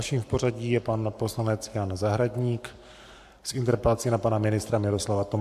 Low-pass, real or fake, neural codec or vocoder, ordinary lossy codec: 14.4 kHz; fake; codec, 44.1 kHz, 7.8 kbps, Pupu-Codec; AAC, 96 kbps